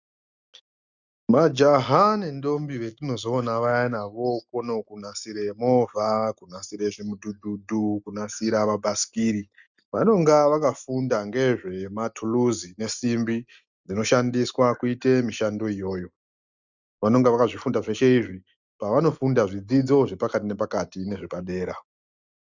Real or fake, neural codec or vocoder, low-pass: real; none; 7.2 kHz